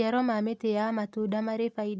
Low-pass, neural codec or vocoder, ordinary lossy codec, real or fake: none; none; none; real